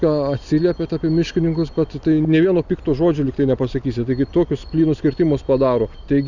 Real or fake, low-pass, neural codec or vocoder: real; 7.2 kHz; none